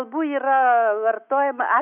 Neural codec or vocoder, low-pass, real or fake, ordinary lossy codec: autoencoder, 48 kHz, 128 numbers a frame, DAC-VAE, trained on Japanese speech; 3.6 kHz; fake; AAC, 32 kbps